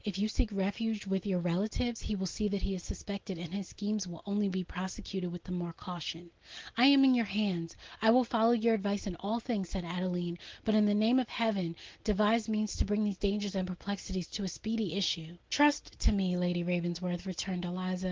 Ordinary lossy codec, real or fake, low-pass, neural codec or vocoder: Opus, 16 kbps; real; 7.2 kHz; none